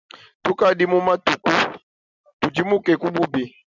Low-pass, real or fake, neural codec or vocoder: 7.2 kHz; real; none